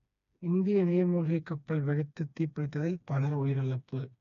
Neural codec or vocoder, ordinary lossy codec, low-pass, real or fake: codec, 16 kHz, 2 kbps, FreqCodec, smaller model; none; 7.2 kHz; fake